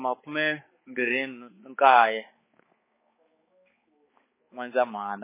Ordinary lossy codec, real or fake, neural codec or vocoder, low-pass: MP3, 16 kbps; fake; codec, 16 kHz, 4 kbps, X-Codec, HuBERT features, trained on balanced general audio; 3.6 kHz